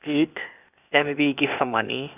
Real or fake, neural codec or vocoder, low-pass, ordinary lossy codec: fake; codec, 16 kHz, 0.8 kbps, ZipCodec; 3.6 kHz; none